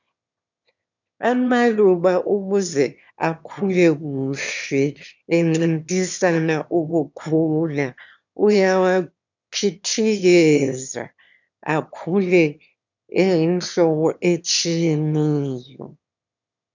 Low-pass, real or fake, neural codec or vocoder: 7.2 kHz; fake; autoencoder, 22.05 kHz, a latent of 192 numbers a frame, VITS, trained on one speaker